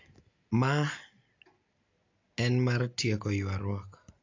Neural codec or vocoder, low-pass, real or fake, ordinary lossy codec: none; 7.2 kHz; real; AAC, 48 kbps